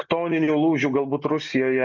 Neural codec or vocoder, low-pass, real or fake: none; 7.2 kHz; real